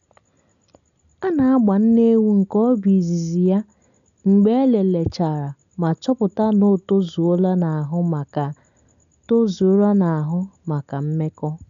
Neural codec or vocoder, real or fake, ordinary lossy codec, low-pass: none; real; MP3, 96 kbps; 7.2 kHz